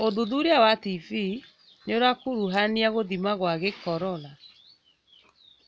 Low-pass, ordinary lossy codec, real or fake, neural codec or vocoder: none; none; real; none